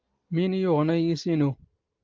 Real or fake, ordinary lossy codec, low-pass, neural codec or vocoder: real; Opus, 32 kbps; 7.2 kHz; none